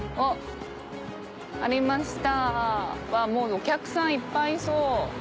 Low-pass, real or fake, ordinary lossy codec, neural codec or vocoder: none; real; none; none